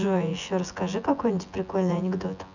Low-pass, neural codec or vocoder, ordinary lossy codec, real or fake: 7.2 kHz; vocoder, 24 kHz, 100 mel bands, Vocos; none; fake